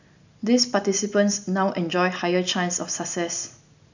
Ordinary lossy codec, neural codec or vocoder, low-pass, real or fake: none; none; 7.2 kHz; real